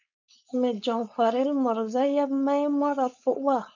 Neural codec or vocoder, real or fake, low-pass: codec, 16 kHz, 4.8 kbps, FACodec; fake; 7.2 kHz